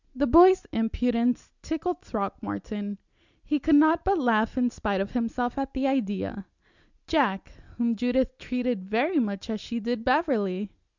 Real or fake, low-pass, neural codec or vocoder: real; 7.2 kHz; none